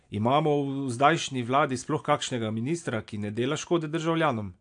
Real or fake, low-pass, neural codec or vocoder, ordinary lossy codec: real; 9.9 kHz; none; AAC, 64 kbps